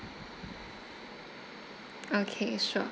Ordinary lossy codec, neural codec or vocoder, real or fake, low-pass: none; none; real; none